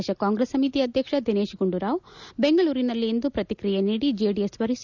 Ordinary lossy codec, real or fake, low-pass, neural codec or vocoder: none; real; 7.2 kHz; none